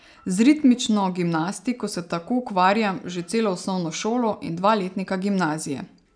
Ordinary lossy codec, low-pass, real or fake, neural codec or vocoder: none; 9.9 kHz; real; none